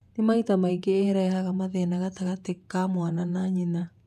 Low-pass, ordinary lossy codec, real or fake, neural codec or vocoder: 14.4 kHz; none; fake; vocoder, 48 kHz, 128 mel bands, Vocos